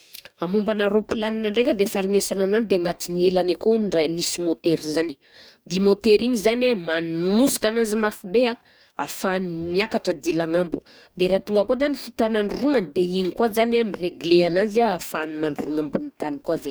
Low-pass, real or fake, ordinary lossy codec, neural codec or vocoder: none; fake; none; codec, 44.1 kHz, 2.6 kbps, DAC